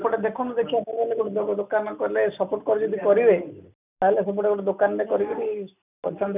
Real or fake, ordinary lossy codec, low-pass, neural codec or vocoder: real; none; 3.6 kHz; none